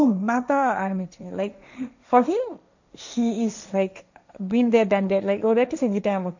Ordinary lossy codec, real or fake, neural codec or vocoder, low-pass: none; fake; codec, 16 kHz, 1.1 kbps, Voila-Tokenizer; 7.2 kHz